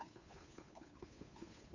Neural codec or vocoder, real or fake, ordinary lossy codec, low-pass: codec, 16 kHz, 2 kbps, FunCodec, trained on Chinese and English, 25 frames a second; fake; Opus, 64 kbps; 7.2 kHz